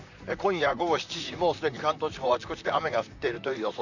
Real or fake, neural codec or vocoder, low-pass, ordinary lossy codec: fake; vocoder, 44.1 kHz, 128 mel bands, Pupu-Vocoder; 7.2 kHz; none